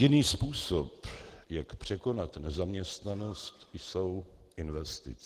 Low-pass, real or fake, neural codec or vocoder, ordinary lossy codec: 14.4 kHz; real; none; Opus, 16 kbps